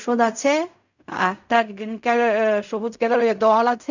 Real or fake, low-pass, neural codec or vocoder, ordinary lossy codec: fake; 7.2 kHz; codec, 16 kHz in and 24 kHz out, 0.4 kbps, LongCat-Audio-Codec, fine tuned four codebook decoder; none